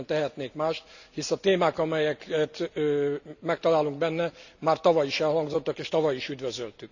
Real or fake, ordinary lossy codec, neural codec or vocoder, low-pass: real; none; none; 7.2 kHz